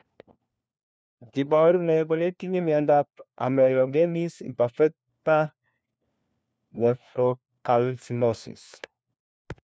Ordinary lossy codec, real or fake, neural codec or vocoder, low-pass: none; fake; codec, 16 kHz, 1 kbps, FunCodec, trained on LibriTTS, 50 frames a second; none